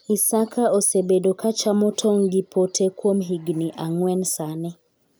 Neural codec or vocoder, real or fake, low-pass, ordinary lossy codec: none; real; none; none